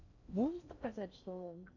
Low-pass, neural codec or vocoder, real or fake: 7.2 kHz; codec, 16 kHz in and 24 kHz out, 0.6 kbps, FocalCodec, streaming, 4096 codes; fake